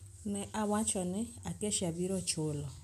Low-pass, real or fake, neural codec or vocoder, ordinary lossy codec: 14.4 kHz; real; none; none